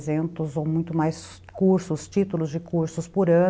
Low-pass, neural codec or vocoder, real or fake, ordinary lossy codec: none; none; real; none